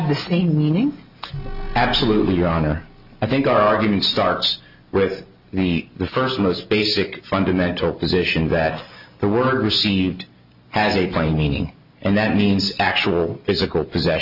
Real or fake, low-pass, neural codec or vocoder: real; 5.4 kHz; none